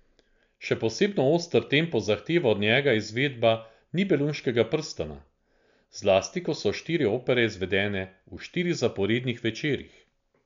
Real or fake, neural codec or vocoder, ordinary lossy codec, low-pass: real; none; MP3, 64 kbps; 7.2 kHz